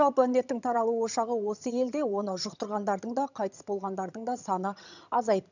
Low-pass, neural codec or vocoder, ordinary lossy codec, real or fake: 7.2 kHz; vocoder, 22.05 kHz, 80 mel bands, HiFi-GAN; none; fake